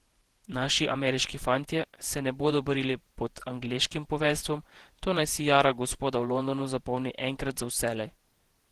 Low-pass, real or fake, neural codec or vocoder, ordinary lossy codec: 14.4 kHz; fake; vocoder, 48 kHz, 128 mel bands, Vocos; Opus, 16 kbps